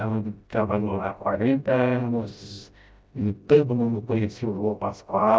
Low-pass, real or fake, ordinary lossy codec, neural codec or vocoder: none; fake; none; codec, 16 kHz, 0.5 kbps, FreqCodec, smaller model